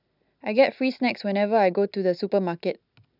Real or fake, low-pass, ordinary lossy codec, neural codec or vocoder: real; 5.4 kHz; none; none